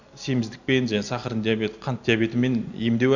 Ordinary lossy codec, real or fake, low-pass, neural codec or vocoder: none; real; 7.2 kHz; none